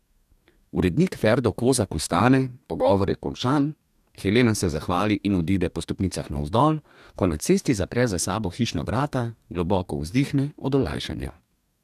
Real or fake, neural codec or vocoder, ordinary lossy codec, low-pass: fake; codec, 44.1 kHz, 2.6 kbps, DAC; none; 14.4 kHz